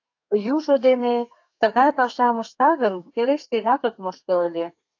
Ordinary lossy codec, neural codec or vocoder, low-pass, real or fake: AAC, 48 kbps; codec, 32 kHz, 1.9 kbps, SNAC; 7.2 kHz; fake